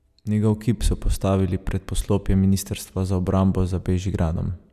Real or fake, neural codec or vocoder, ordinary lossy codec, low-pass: real; none; none; 14.4 kHz